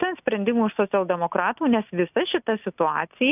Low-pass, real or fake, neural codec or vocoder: 3.6 kHz; real; none